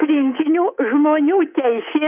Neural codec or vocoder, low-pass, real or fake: codec, 24 kHz, 3.1 kbps, DualCodec; 3.6 kHz; fake